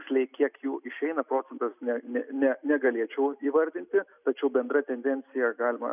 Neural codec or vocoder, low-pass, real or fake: none; 3.6 kHz; real